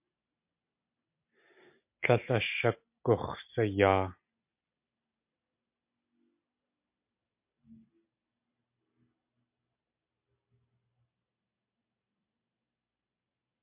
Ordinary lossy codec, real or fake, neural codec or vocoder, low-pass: MP3, 32 kbps; real; none; 3.6 kHz